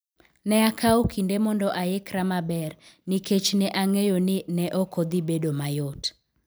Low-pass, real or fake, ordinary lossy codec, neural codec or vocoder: none; real; none; none